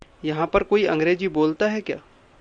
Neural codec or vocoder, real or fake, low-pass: none; real; 9.9 kHz